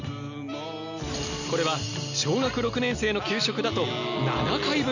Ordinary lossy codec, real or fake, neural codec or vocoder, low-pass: none; real; none; 7.2 kHz